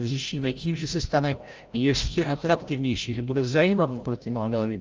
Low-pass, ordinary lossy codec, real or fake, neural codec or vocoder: 7.2 kHz; Opus, 24 kbps; fake; codec, 16 kHz, 0.5 kbps, FreqCodec, larger model